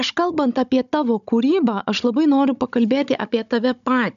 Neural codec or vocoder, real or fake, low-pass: codec, 16 kHz, 16 kbps, FunCodec, trained on Chinese and English, 50 frames a second; fake; 7.2 kHz